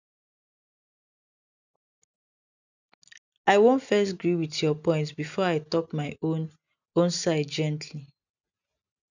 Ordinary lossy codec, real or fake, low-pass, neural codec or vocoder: none; real; 7.2 kHz; none